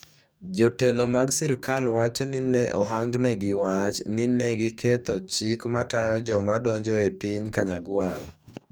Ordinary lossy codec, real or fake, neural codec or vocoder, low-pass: none; fake; codec, 44.1 kHz, 2.6 kbps, DAC; none